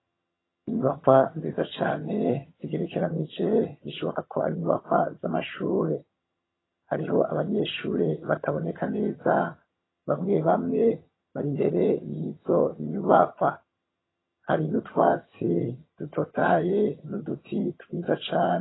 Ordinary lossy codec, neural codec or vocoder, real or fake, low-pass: AAC, 16 kbps; vocoder, 22.05 kHz, 80 mel bands, HiFi-GAN; fake; 7.2 kHz